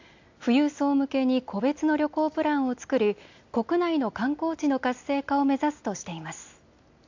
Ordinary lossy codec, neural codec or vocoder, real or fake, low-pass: AAC, 48 kbps; none; real; 7.2 kHz